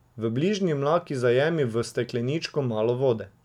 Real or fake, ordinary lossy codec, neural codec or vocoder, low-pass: real; none; none; 19.8 kHz